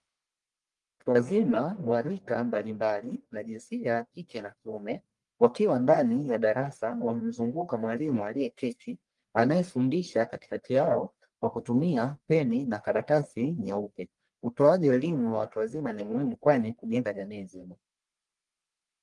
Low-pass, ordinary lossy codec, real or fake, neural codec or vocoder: 10.8 kHz; Opus, 32 kbps; fake; codec, 44.1 kHz, 1.7 kbps, Pupu-Codec